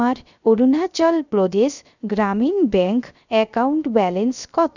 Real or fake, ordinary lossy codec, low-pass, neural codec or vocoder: fake; none; 7.2 kHz; codec, 16 kHz, 0.3 kbps, FocalCodec